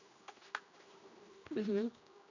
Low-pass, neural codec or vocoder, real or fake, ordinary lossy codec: 7.2 kHz; codec, 16 kHz, 1 kbps, X-Codec, HuBERT features, trained on general audio; fake; none